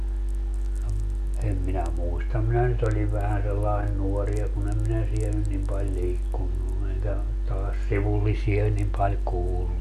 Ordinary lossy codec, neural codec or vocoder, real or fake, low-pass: MP3, 96 kbps; none; real; 14.4 kHz